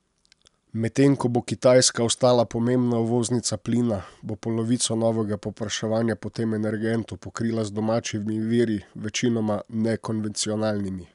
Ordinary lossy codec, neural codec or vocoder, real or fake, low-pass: none; none; real; 10.8 kHz